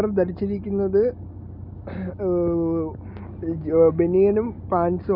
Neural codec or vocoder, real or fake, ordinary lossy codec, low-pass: none; real; none; 5.4 kHz